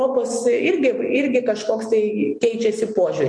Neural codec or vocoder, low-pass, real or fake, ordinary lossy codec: none; 9.9 kHz; real; MP3, 48 kbps